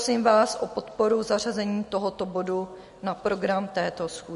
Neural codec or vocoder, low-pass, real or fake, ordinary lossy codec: none; 14.4 kHz; real; MP3, 48 kbps